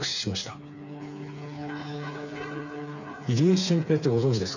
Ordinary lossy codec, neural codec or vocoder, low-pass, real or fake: none; codec, 16 kHz, 4 kbps, FreqCodec, smaller model; 7.2 kHz; fake